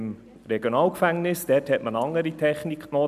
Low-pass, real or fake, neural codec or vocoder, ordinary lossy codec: 14.4 kHz; real; none; none